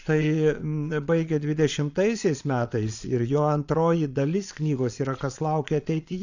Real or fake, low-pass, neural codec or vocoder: fake; 7.2 kHz; vocoder, 22.05 kHz, 80 mel bands, Vocos